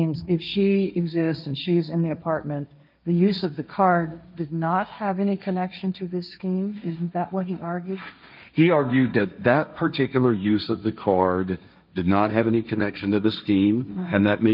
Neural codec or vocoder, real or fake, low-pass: codec, 16 kHz, 1.1 kbps, Voila-Tokenizer; fake; 5.4 kHz